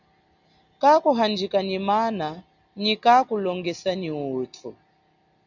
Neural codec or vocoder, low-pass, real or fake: none; 7.2 kHz; real